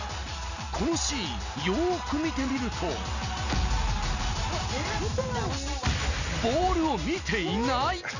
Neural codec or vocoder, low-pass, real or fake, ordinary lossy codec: none; 7.2 kHz; real; none